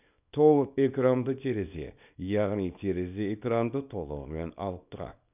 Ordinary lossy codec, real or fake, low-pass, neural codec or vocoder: none; fake; 3.6 kHz; codec, 24 kHz, 0.9 kbps, WavTokenizer, small release